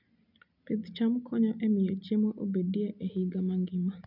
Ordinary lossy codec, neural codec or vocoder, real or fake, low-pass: none; none; real; 5.4 kHz